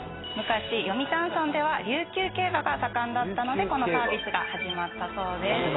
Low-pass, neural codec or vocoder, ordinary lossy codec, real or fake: 7.2 kHz; none; AAC, 16 kbps; real